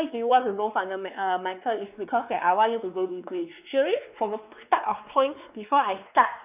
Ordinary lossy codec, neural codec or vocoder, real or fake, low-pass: none; codec, 16 kHz, 2 kbps, X-Codec, WavLM features, trained on Multilingual LibriSpeech; fake; 3.6 kHz